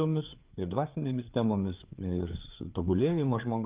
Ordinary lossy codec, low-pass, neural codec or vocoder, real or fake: Opus, 32 kbps; 3.6 kHz; codec, 16 kHz, 4 kbps, FreqCodec, larger model; fake